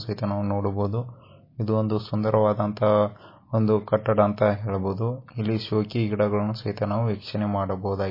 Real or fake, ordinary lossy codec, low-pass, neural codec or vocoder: real; MP3, 24 kbps; 5.4 kHz; none